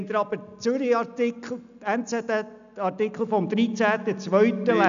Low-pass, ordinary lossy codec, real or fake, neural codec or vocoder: 7.2 kHz; none; real; none